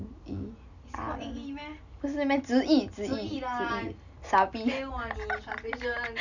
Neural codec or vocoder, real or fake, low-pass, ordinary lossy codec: none; real; 7.2 kHz; none